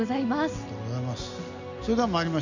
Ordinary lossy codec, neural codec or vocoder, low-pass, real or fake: none; none; 7.2 kHz; real